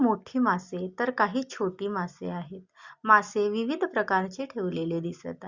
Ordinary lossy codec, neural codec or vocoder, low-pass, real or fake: Opus, 64 kbps; none; 7.2 kHz; real